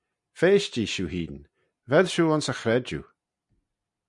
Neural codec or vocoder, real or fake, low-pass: none; real; 10.8 kHz